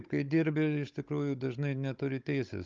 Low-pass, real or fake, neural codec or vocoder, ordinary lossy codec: 7.2 kHz; fake; codec, 16 kHz, 16 kbps, FunCodec, trained on Chinese and English, 50 frames a second; Opus, 24 kbps